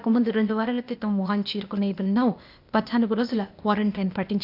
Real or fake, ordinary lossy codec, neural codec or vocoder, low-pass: fake; none; codec, 16 kHz, 0.8 kbps, ZipCodec; 5.4 kHz